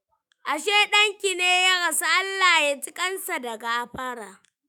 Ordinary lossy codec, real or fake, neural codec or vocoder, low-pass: none; fake; autoencoder, 48 kHz, 128 numbers a frame, DAC-VAE, trained on Japanese speech; none